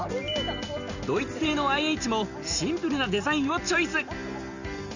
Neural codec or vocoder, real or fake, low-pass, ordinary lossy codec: none; real; 7.2 kHz; none